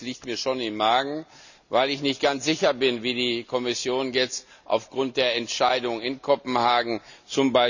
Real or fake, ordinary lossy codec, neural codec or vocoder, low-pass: real; none; none; 7.2 kHz